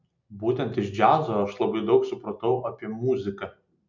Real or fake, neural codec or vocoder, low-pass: real; none; 7.2 kHz